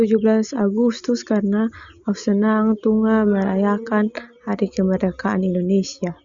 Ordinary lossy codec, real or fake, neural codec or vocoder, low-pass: Opus, 64 kbps; real; none; 7.2 kHz